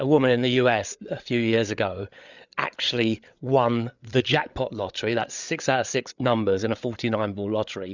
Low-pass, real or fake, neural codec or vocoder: 7.2 kHz; fake; codec, 16 kHz, 8 kbps, FreqCodec, larger model